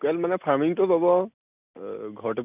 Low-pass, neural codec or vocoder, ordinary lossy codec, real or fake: 3.6 kHz; none; none; real